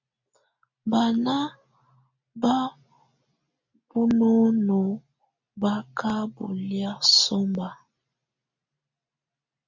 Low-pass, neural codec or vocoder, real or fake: 7.2 kHz; none; real